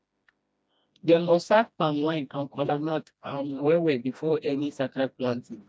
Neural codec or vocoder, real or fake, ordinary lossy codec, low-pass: codec, 16 kHz, 1 kbps, FreqCodec, smaller model; fake; none; none